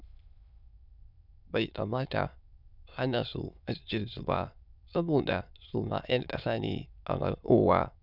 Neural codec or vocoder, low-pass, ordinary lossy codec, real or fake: autoencoder, 22.05 kHz, a latent of 192 numbers a frame, VITS, trained on many speakers; 5.4 kHz; none; fake